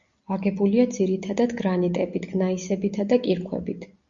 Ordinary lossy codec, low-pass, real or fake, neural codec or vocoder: Opus, 64 kbps; 7.2 kHz; real; none